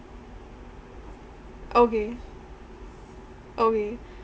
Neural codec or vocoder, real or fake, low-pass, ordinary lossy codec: none; real; none; none